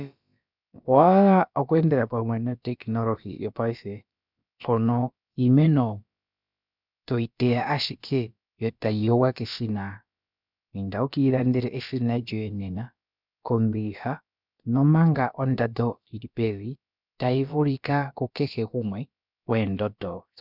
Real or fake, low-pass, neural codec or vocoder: fake; 5.4 kHz; codec, 16 kHz, about 1 kbps, DyCAST, with the encoder's durations